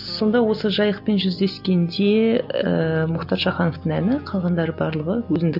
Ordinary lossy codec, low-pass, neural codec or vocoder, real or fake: none; 5.4 kHz; none; real